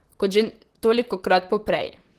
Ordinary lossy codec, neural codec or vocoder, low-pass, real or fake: Opus, 16 kbps; vocoder, 44.1 kHz, 128 mel bands, Pupu-Vocoder; 14.4 kHz; fake